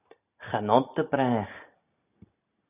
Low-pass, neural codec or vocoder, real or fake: 3.6 kHz; none; real